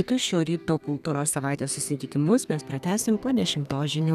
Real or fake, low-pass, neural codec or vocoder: fake; 14.4 kHz; codec, 32 kHz, 1.9 kbps, SNAC